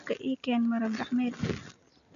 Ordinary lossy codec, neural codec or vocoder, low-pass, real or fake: none; none; 7.2 kHz; real